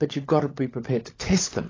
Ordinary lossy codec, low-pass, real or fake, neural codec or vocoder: AAC, 32 kbps; 7.2 kHz; fake; codec, 16 kHz, 16 kbps, FunCodec, trained on LibriTTS, 50 frames a second